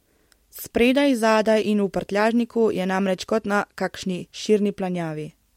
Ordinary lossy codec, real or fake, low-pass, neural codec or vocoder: MP3, 64 kbps; real; 19.8 kHz; none